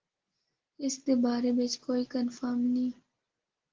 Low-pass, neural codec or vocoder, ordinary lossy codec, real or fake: 7.2 kHz; none; Opus, 16 kbps; real